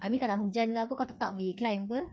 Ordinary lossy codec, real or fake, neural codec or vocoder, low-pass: none; fake; codec, 16 kHz, 2 kbps, FreqCodec, larger model; none